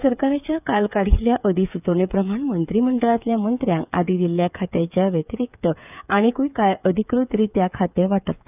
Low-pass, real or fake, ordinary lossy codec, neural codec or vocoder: 3.6 kHz; fake; none; codec, 16 kHz, 8 kbps, FreqCodec, smaller model